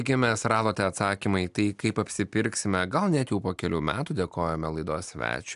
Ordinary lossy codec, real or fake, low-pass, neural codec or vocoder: AAC, 96 kbps; real; 10.8 kHz; none